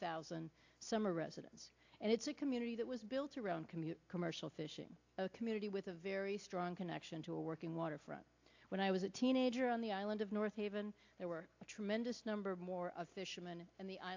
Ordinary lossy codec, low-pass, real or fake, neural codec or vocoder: AAC, 48 kbps; 7.2 kHz; real; none